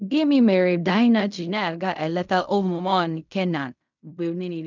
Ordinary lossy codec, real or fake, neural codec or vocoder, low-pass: none; fake; codec, 16 kHz in and 24 kHz out, 0.4 kbps, LongCat-Audio-Codec, fine tuned four codebook decoder; 7.2 kHz